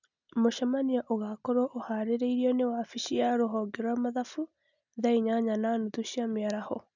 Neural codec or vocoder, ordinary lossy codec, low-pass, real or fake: none; none; 7.2 kHz; real